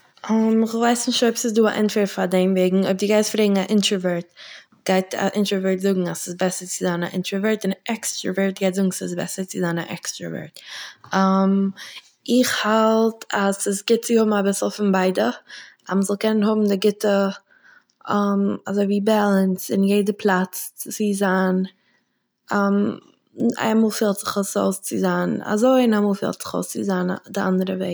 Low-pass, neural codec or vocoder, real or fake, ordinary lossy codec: none; none; real; none